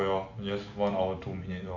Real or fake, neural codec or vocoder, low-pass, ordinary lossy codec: real; none; 7.2 kHz; AAC, 48 kbps